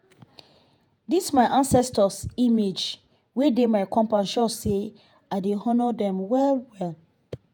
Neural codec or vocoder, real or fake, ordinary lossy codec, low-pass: vocoder, 48 kHz, 128 mel bands, Vocos; fake; none; none